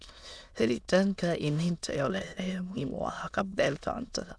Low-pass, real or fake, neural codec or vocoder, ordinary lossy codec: none; fake; autoencoder, 22.05 kHz, a latent of 192 numbers a frame, VITS, trained on many speakers; none